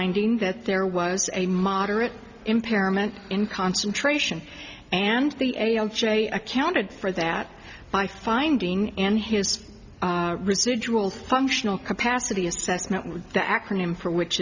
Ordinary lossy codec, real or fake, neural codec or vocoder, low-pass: Opus, 64 kbps; real; none; 7.2 kHz